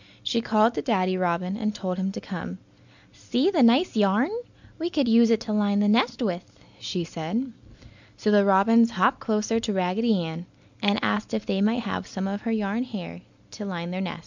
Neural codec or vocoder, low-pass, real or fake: none; 7.2 kHz; real